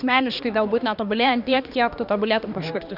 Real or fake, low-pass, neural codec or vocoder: fake; 5.4 kHz; codec, 16 kHz, 2 kbps, X-Codec, HuBERT features, trained on balanced general audio